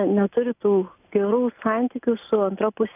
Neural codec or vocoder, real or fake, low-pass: none; real; 3.6 kHz